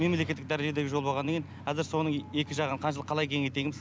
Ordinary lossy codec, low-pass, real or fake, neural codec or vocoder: none; none; real; none